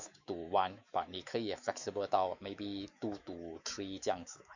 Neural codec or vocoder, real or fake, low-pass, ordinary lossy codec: autoencoder, 48 kHz, 128 numbers a frame, DAC-VAE, trained on Japanese speech; fake; 7.2 kHz; none